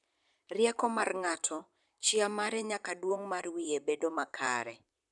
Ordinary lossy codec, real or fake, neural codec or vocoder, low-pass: none; fake; vocoder, 44.1 kHz, 128 mel bands, Pupu-Vocoder; 10.8 kHz